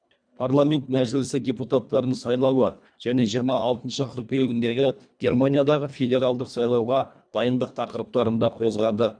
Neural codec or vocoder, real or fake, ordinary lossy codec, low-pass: codec, 24 kHz, 1.5 kbps, HILCodec; fake; none; 9.9 kHz